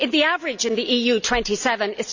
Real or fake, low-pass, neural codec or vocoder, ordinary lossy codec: real; 7.2 kHz; none; none